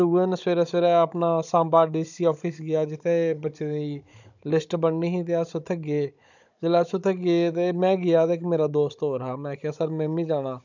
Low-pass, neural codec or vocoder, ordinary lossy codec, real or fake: 7.2 kHz; codec, 16 kHz, 16 kbps, FunCodec, trained on Chinese and English, 50 frames a second; none; fake